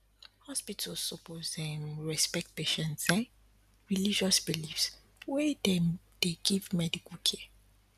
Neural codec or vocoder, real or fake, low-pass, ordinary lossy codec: none; real; 14.4 kHz; none